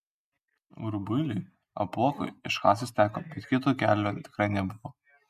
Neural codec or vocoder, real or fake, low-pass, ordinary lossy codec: none; real; 14.4 kHz; MP3, 96 kbps